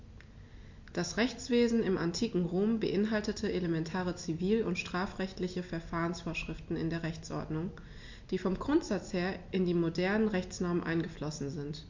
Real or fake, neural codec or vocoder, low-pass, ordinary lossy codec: real; none; 7.2 kHz; MP3, 48 kbps